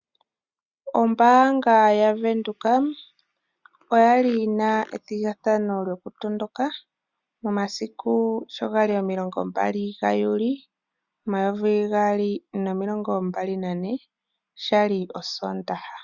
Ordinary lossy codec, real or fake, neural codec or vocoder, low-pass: Opus, 64 kbps; real; none; 7.2 kHz